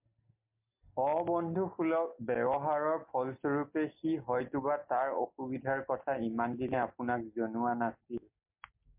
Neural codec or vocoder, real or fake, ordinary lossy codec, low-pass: none; real; MP3, 32 kbps; 3.6 kHz